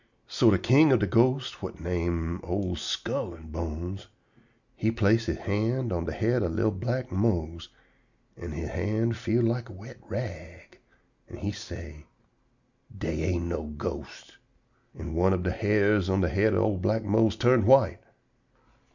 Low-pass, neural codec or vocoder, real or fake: 7.2 kHz; none; real